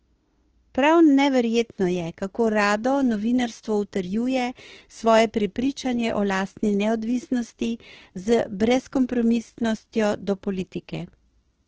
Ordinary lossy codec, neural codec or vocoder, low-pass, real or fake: Opus, 16 kbps; autoencoder, 48 kHz, 32 numbers a frame, DAC-VAE, trained on Japanese speech; 7.2 kHz; fake